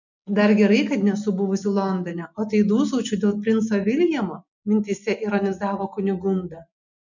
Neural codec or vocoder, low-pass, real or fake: none; 7.2 kHz; real